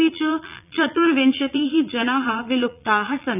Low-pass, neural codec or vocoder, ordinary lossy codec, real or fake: 3.6 kHz; vocoder, 22.05 kHz, 80 mel bands, Vocos; none; fake